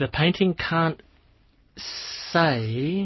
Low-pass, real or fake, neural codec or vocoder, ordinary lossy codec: 7.2 kHz; real; none; MP3, 24 kbps